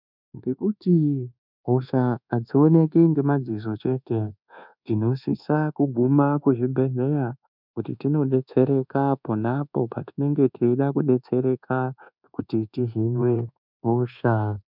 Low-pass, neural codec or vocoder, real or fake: 5.4 kHz; codec, 24 kHz, 1.2 kbps, DualCodec; fake